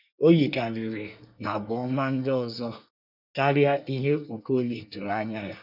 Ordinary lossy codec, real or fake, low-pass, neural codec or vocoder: none; fake; 5.4 kHz; codec, 24 kHz, 1 kbps, SNAC